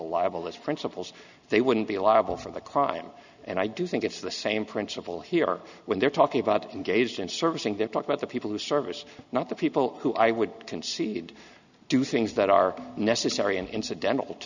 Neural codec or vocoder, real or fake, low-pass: none; real; 7.2 kHz